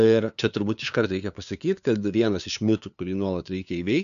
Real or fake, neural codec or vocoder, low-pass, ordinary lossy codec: fake; codec, 16 kHz, 2 kbps, FunCodec, trained on LibriTTS, 25 frames a second; 7.2 kHz; MP3, 96 kbps